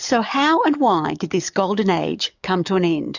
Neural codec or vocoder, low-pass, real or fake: codec, 44.1 kHz, 7.8 kbps, DAC; 7.2 kHz; fake